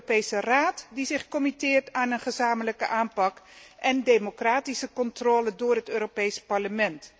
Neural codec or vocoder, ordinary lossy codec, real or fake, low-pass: none; none; real; none